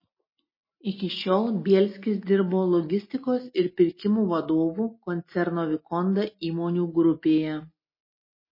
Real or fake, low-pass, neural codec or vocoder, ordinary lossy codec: real; 5.4 kHz; none; MP3, 24 kbps